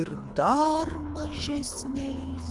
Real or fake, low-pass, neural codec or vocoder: fake; 10.8 kHz; codec, 24 kHz, 3 kbps, HILCodec